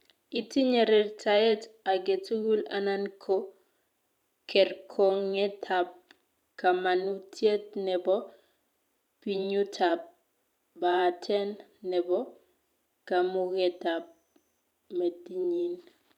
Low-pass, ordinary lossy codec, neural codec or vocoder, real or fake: 19.8 kHz; none; vocoder, 44.1 kHz, 128 mel bands every 512 samples, BigVGAN v2; fake